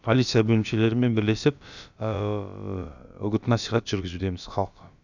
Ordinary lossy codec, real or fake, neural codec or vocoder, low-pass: none; fake; codec, 16 kHz, about 1 kbps, DyCAST, with the encoder's durations; 7.2 kHz